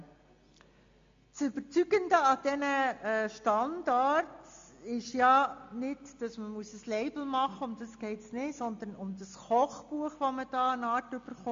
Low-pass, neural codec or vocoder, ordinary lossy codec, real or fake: 7.2 kHz; none; MP3, 48 kbps; real